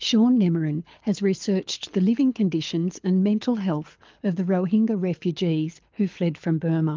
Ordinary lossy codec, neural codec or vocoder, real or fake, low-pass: Opus, 24 kbps; codec, 24 kHz, 6 kbps, HILCodec; fake; 7.2 kHz